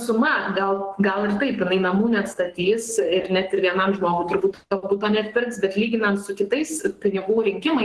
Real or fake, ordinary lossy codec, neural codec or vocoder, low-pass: fake; Opus, 16 kbps; codec, 44.1 kHz, 7.8 kbps, Pupu-Codec; 10.8 kHz